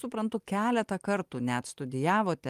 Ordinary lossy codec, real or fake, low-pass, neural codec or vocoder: Opus, 32 kbps; real; 14.4 kHz; none